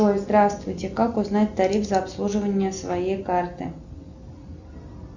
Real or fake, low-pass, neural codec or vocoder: real; 7.2 kHz; none